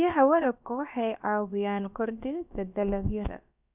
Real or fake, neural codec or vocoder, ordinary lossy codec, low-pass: fake; codec, 16 kHz, about 1 kbps, DyCAST, with the encoder's durations; none; 3.6 kHz